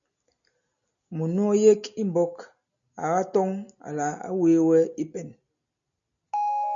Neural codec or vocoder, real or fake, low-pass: none; real; 7.2 kHz